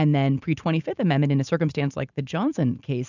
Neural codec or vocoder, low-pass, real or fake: none; 7.2 kHz; real